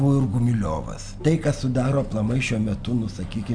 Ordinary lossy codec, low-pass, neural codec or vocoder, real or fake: MP3, 96 kbps; 9.9 kHz; none; real